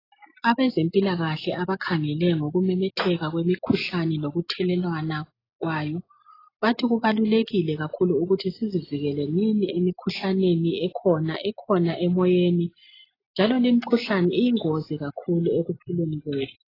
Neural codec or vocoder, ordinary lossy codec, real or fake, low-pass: none; AAC, 24 kbps; real; 5.4 kHz